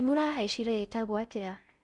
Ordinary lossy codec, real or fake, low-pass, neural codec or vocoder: none; fake; 10.8 kHz; codec, 16 kHz in and 24 kHz out, 0.6 kbps, FocalCodec, streaming, 4096 codes